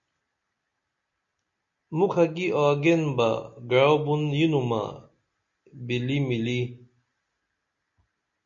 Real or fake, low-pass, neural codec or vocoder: real; 7.2 kHz; none